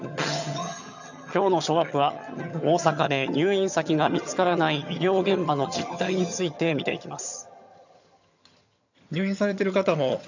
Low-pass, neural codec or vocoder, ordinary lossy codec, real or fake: 7.2 kHz; vocoder, 22.05 kHz, 80 mel bands, HiFi-GAN; none; fake